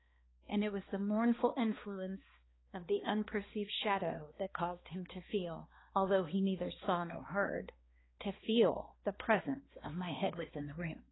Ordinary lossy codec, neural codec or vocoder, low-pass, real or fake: AAC, 16 kbps; codec, 16 kHz, 2 kbps, X-Codec, HuBERT features, trained on balanced general audio; 7.2 kHz; fake